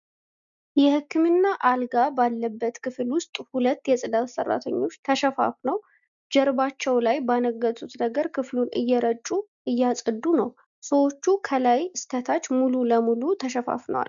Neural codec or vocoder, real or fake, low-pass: none; real; 7.2 kHz